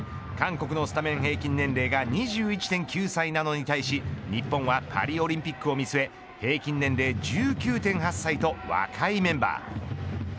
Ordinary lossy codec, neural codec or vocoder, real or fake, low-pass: none; none; real; none